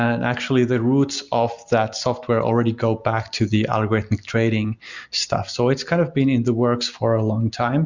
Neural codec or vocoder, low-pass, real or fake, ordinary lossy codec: none; 7.2 kHz; real; Opus, 64 kbps